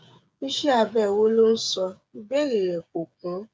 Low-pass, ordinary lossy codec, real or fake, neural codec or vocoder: none; none; fake; codec, 16 kHz, 8 kbps, FreqCodec, smaller model